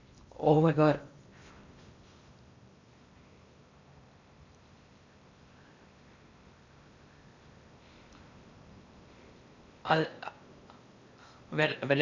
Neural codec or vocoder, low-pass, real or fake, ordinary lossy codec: codec, 16 kHz in and 24 kHz out, 0.8 kbps, FocalCodec, streaming, 65536 codes; 7.2 kHz; fake; Opus, 64 kbps